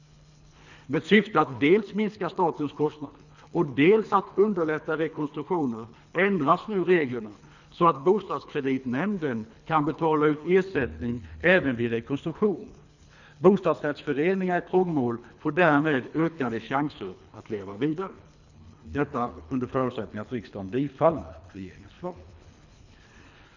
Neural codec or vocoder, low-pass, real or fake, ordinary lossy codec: codec, 24 kHz, 3 kbps, HILCodec; 7.2 kHz; fake; none